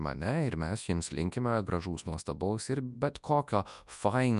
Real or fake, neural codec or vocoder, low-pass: fake; codec, 24 kHz, 0.9 kbps, WavTokenizer, large speech release; 10.8 kHz